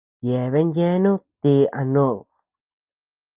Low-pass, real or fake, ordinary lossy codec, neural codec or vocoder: 3.6 kHz; real; Opus, 24 kbps; none